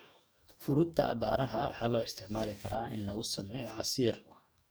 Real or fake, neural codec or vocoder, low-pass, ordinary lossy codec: fake; codec, 44.1 kHz, 2.6 kbps, DAC; none; none